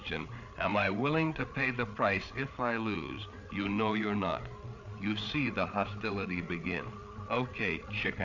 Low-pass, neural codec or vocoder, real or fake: 7.2 kHz; codec, 16 kHz, 8 kbps, FreqCodec, larger model; fake